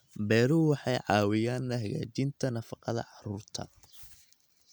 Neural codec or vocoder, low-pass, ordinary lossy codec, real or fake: none; none; none; real